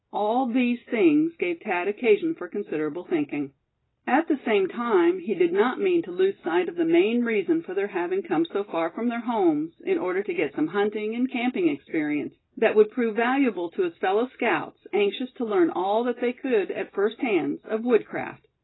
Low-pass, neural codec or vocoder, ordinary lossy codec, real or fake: 7.2 kHz; none; AAC, 16 kbps; real